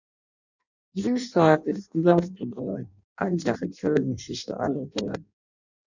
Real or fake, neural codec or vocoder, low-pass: fake; codec, 16 kHz in and 24 kHz out, 0.6 kbps, FireRedTTS-2 codec; 7.2 kHz